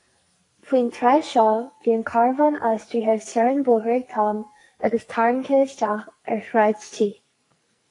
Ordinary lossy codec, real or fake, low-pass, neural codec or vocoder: AAC, 48 kbps; fake; 10.8 kHz; codec, 44.1 kHz, 2.6 kbps, SNAC